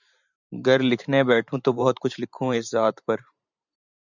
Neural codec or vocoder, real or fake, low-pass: vocoder, 44.1 kHz, 128 mel bands every 512 samples, BigVGAN v2; fake; 7.2 kHz